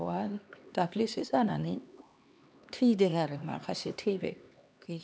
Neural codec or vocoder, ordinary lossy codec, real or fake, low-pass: codec, 16 kHz, 2 kbps, X-Codec, HuBERT features, trained on LibriSpeech; none; fake; none